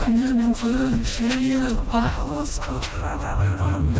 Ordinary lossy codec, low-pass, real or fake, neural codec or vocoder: none; none; fake; codec, 16 kHz, 1 kbps, FreqCodec, smaller model